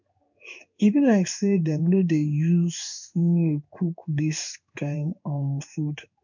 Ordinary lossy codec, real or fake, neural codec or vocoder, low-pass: none; fake; codec, 16 kHz in and 24 kHz out, 1 kbps, XY-Tokenizer; 7.2 kHz